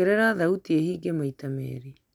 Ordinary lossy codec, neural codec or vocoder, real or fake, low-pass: none; none; real; 19.8 kHz